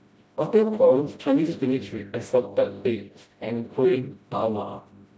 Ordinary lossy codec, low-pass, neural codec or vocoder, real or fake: none; none; codec, 16 kHz, 0.5 kbps, FreqCodec, smaller model; fake